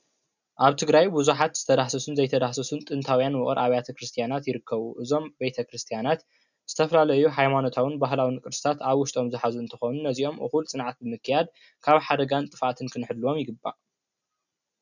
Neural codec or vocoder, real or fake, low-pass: none; real; 7.2 kHz